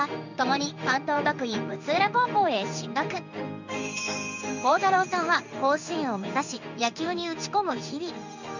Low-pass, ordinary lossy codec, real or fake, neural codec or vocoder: 7.2 kHz; none; fake; codec, 16 kHz in and 24 kHz out, 1 kbps, XY-Tokenizer